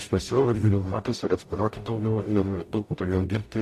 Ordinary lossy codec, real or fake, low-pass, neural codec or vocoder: AAC, 64 kbps; fake; 14.4 kHz; codec, 44.1 kHz, 0.9 kbps, DAC